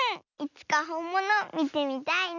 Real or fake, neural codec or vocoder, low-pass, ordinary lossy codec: real; none; 7.2 kHz; none